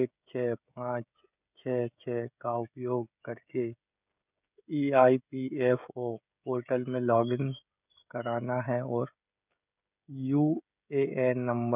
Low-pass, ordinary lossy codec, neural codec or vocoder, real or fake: 3.6 kHz; none; codec, 16 kHz, 16 kbps, FreqCodec, smaller model; fake